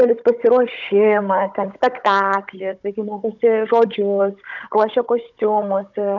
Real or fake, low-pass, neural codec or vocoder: fake; 7.2 kHz; codec, 16 kHz, 16 kbps, FunCodec, trained on Chinese and English, 50 frames a second